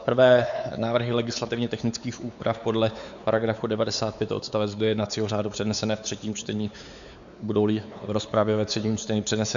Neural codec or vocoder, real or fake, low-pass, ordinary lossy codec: codec, 16 kHz, 4 kbps, X-Codec, WavLM features, trained on Multilingual LibriSpeech; fake; 7.2 kHz; MP3, 96 kbps